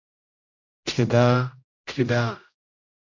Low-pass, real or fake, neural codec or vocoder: 7.2 kHz; fake; codec, 16 kHz, 0.5 kbps, X-Codec, HuBERT features, trained on general audio